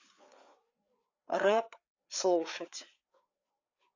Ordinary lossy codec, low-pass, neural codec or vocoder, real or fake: AAC, 48 kbps; 7.2 kHz; codec, 16 kHz, 4 kbps, FreqCodec, larger model; fake